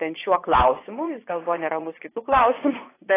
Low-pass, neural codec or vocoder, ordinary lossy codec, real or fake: 3.6 kHz; vocoder, 24 kHz, 100 mel bands, Vocos; AAC, 16 kbps; fake